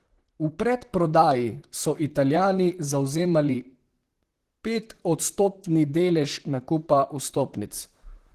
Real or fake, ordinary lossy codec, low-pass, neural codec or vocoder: fake; Opus, 16 kbps; 14.4 kHz; vocoder, 44.1 kHz, 128 mel bands, Pupu-Vocoder